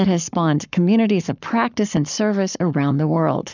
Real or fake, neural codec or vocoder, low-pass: fake; codec, 44.1 kHz, 7.8 kbps, Pupu-Codec; 7.2 kHz